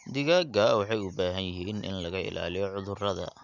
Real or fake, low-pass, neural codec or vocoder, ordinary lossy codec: real; 7.2 kHz; none; none